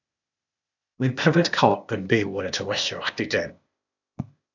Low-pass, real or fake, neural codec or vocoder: 7.2 kHz; fake; codec, 16 kHz, 0.8 kbps, ZipCodec